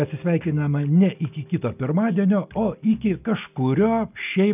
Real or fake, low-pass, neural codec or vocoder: real; 3.6 kHz; none